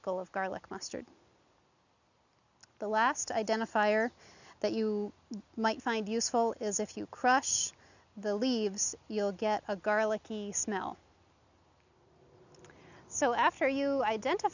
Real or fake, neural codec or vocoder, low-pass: real; none; 7.2 kHz